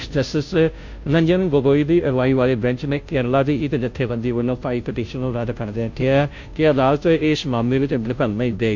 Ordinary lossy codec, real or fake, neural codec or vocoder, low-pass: MP3, 48 kbps; fake; codec, 16 kHz, 0.5 kbps, FunCodec, trained on Chinese and English, 25 frames a second; 7.2 kHz